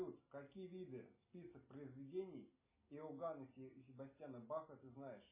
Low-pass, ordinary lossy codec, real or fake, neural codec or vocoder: 3.6 kHz; MP3, 16 kbps; real; none